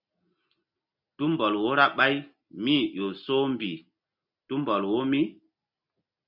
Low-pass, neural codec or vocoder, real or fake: 5.4 kHz; none; real